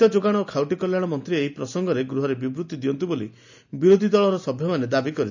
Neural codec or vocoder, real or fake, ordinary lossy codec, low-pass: none; real; none; 7.2 kHz